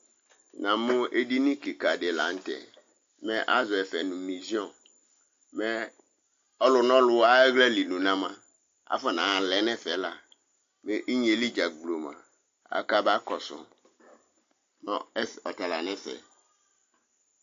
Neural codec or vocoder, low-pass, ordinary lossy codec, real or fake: none; 7.2 kHz; AAC, 48 kbps; real